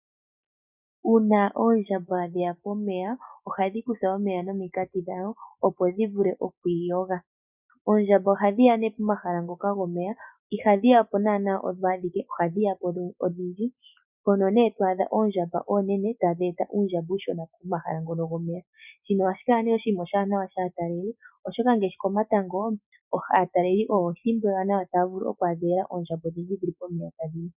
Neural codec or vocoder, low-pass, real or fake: none; 3.6 kHz; real